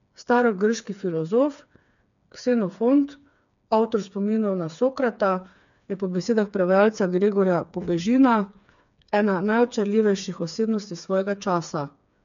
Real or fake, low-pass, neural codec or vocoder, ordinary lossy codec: fake; 7.2 kHz; codec, 16 kHz, 4 kbps, FreqCodec, smaller model; MP3, 96 kbps